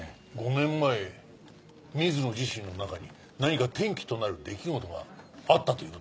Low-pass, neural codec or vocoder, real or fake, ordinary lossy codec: none; none; real; none